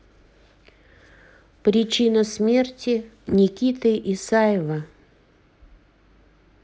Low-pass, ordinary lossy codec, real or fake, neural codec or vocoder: none; none; real; none